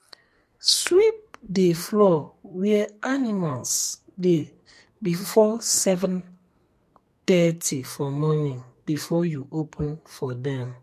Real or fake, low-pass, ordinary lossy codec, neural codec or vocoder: fake; 14.4 kHz; MP3, 64 kbps; codec, 44.1 kHz, 2.6 kbps, SNAC